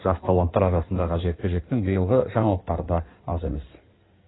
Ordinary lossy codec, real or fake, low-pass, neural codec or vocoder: AAC, 16 kbps; fake; 7.2 kHz; codec, 16 kHz in and 24 kHz out, 1.1 kbps, FireRedTTS-2 codec